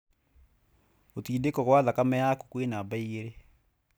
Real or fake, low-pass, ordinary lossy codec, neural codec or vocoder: real; none; none; none